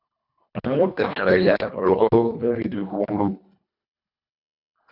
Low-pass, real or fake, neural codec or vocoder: 5.4 kHz; fake; codec, 24 kHz, 1.5 kbps, HILCodec